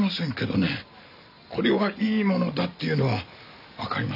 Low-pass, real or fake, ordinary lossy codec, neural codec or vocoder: 5.4 kHz; real; none; none